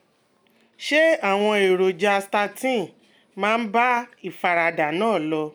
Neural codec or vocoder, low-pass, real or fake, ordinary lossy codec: none; none; real; none